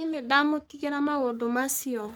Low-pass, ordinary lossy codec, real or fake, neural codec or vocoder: none; none; fake; codec, 44.1 kHz, 3.4 kbps, Pupu-Codec